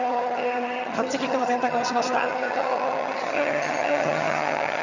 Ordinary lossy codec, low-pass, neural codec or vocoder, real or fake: none; 7.2 kHz; vocoder, 22.05 kHz, 80 mel bands, HiFi-GAN; fake